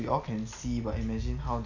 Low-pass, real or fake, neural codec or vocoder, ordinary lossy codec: 7.2 kHz; real; none; none